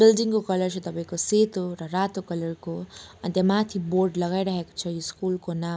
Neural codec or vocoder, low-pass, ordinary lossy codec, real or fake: none; none; none; real